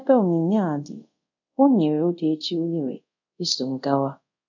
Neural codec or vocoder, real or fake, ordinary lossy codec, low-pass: codec, 24 kHz, 0.5 kbps, DualCodec; fake; none; 7.2 kHz